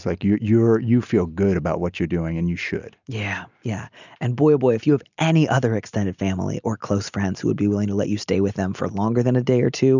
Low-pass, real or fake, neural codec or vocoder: 7.2 kHz; real; none